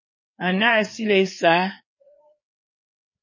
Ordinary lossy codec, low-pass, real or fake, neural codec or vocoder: MP3, 32 kbps; 7.2 kHz; fake; codec, 16 kHz, 4 kbps, FreqCodec, larger model